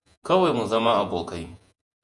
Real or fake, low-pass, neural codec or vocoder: fake; 10.8 kHz; vocoder, 48 kHz, 128 mel bands, Vocos